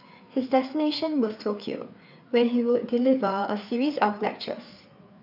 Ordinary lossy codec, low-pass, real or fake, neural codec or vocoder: none; 5.4 kHz; fake; codec, 16 kHz, 4 kbps, FreqCodec, larger model